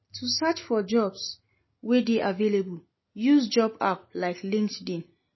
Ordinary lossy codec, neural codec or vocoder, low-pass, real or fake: MP3, 24 kbps; none; 7.2 kHz; real